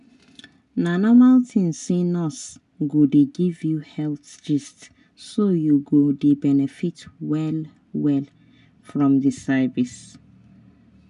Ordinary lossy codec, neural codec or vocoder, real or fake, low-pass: none; none; real; 10.8 kHz